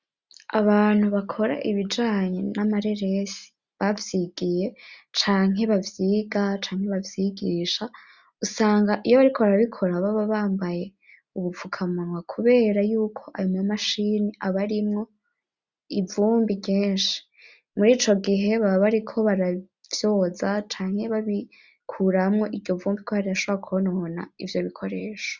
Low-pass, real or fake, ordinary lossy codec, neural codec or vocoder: 7.2 kHz; real; Opus, 64 kbps; none